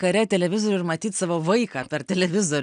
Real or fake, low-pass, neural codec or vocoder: real; 9.9 kHz; none